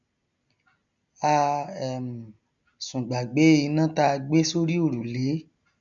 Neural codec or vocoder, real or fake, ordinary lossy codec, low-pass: none; real; none; 7.2 kHz